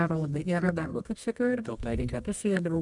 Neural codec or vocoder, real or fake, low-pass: codec, 24 kHz, 0.9 kbps, WavTokenizer, medium music audio release; fake; 10.8 kHz